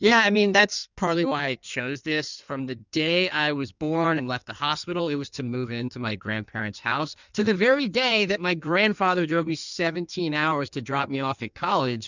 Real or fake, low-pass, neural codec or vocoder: fake; 7.2 kHz; codec, 16 kHz in and 24 kHz out, 1.1 kbps, FireRedTTS-2 codec